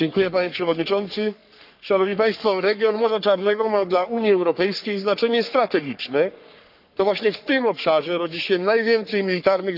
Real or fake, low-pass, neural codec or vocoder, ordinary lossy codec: fake; 5.4 kHz; codec, 44.1 kHz, 3.4 kbps, Pupu-Codec; none